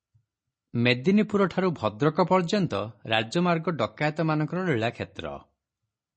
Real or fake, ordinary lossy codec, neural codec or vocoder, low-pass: real; MP3, 32 kbps; none; 10.8 kHz